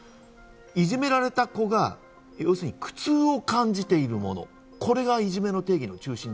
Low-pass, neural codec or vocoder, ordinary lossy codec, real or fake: none; none; none; real